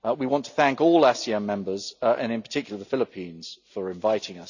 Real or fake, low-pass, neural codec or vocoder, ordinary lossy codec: real; 7.2 kHz; none; none